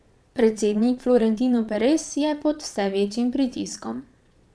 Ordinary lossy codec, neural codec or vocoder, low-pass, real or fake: none; vocoder, 22.05 kHz, 80 mel bands, Vocos; none; fake